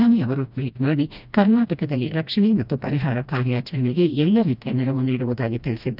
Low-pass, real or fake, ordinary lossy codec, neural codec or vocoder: 5.4 kHz; fake; none; codec, 16 kHz, 1 kbps, FreqCodec, smaller model